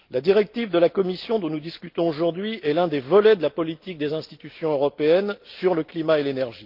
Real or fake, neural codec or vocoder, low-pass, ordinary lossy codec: real; none; 5.4 kHz; Opus, 32 kbps